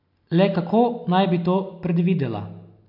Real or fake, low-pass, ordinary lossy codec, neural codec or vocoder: real; 5.4 kHz; none; none